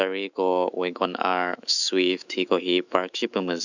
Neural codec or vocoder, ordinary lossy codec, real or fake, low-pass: autoencoder, 48 kHz, 128 numbers a frame, DAC-VAE, trained on Japanese speech; none; fake; 7.2 kHz